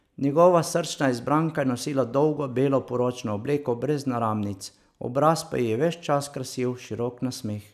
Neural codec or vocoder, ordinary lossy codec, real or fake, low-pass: none; none; real; 14.4 kHz